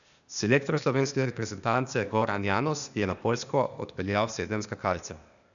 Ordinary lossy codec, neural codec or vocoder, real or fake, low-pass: none; codec, 16 kHz, 0.8 kbps, ZipCodec; fake; 7.2 kHz